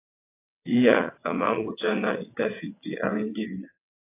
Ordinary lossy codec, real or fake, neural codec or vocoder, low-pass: AAC, 24 kbps; fake; vocoder, 22.05 kHz, 80 mel bands, WaveNeXt; 3.6 kHz